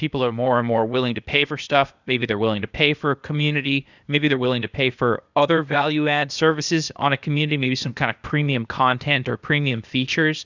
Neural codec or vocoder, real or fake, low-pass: codec, 16 kHz, 0.8 kbps, ZipCodec; fake; 7.2 kHz